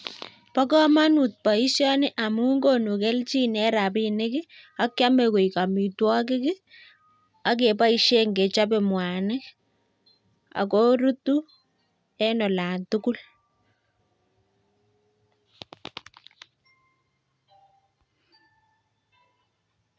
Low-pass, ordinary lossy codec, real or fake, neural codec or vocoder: none; none; real; none